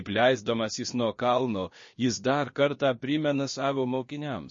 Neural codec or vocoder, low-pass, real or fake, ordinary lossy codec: codec, 16 kHz, about 1 kbps, DyCAST, with the encoder's durations; 7.2 kHz; fake; MP3, 32 kbps